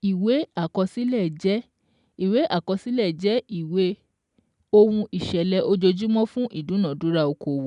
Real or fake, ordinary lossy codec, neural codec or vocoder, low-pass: real; none; none; 10.8 kHz